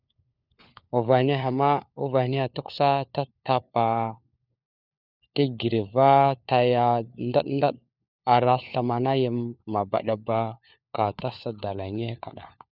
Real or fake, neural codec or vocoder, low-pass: fake; codec, 16 kHz, 4 kbps, FunCodec, trained on LibriTTS, 50 frames a second; 5.4 kHz